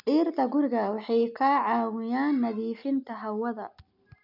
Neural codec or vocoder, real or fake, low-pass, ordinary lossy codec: none; real; 5.4 kHz; none